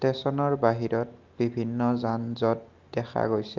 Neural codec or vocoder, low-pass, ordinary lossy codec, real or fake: none; 7.2 kHz; Opus, 32 kbps; real